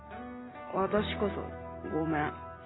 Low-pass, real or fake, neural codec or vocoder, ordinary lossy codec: 7.2 kHz; real; none; AAC, 16 kbps